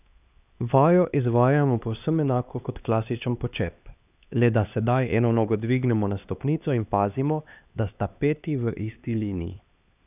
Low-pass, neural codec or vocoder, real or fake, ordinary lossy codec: 3.6 kHz; codec, 16 kHz, 2 kbps, X-Codec, HuBERT features, trained on LibriSpeech; fake; none